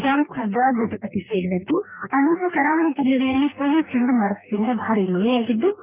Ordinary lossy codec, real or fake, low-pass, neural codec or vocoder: none; fake; 3.6 kHz; codec, 44.1 kHz, 2.6 kbps, DAC